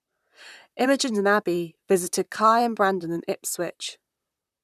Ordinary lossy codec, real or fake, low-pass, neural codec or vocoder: none; fake; 14.4 kHz; vocoder, 44.1 kHz, 128 mel bands, Pupu-Vocoder